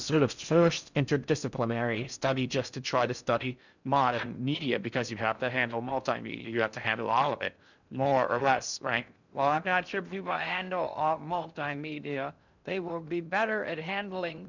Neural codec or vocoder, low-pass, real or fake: codec, 16 kHz in and 24 kHz out, 0.6 kbps, FocalCodec, streaming, 4096 codes; 7.2 kHz; fake